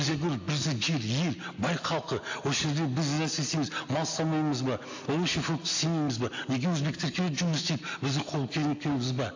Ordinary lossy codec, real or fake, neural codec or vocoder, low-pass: AAC, 48 kbps; real; none; 7.2 kHz